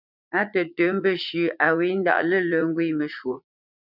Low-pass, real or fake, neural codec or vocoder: 5.4 kHz; fake; vocoder, 44.1 kHz, 80 mel bands, Vocos